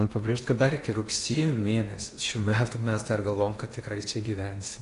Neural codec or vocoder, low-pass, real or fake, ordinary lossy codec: codec, 16 kHz in and 24 kHz out, 0.8 kbps, FocalCodec, streaming, 65536 codes; 10.8 kHz; fake; AAC, 48 kbps